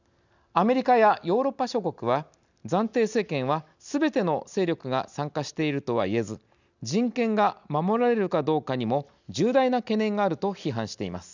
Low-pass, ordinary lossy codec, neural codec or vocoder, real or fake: 7.2 kHz; none; none; real